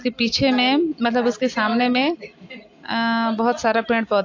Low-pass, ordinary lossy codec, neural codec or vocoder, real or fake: 7.2 kHz; AAC, 48 kbps; none; real